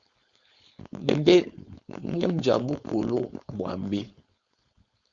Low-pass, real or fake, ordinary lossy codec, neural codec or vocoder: 7.2 kHz; fake; Opus, 64 kbps; codec, 16 kHz, 4.8 kbps, FACodec